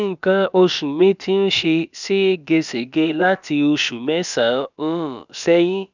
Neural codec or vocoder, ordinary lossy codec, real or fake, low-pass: codec, 16 kHz, about 1 kbps, DyCAST, with the encoder's durations; none; fake; 7.2 kHz